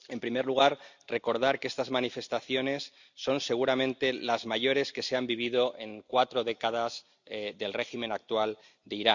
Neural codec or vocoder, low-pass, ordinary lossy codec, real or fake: none; 7.2 kHz; Opus, 64 kbps; real